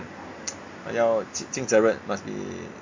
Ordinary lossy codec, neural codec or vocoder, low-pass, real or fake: MP3, 48 kbps; none; 7.2 kHz; real